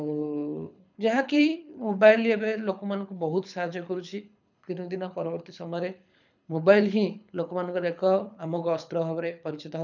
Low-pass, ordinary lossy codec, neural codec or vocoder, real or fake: 7.2 kHz; none; codec, 24 kHz, 6 kbps, HILCodec; fake